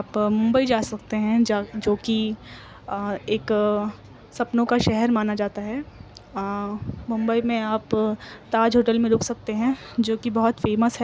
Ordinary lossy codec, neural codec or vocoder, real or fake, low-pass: none; none; real; none